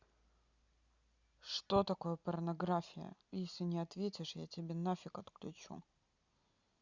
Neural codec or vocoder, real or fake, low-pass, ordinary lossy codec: none; real; 7.2 kHz; Opus, 64 kbps